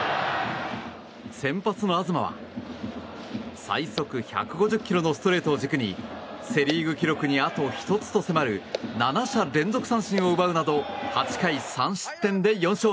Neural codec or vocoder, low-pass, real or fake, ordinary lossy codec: none; none; real; none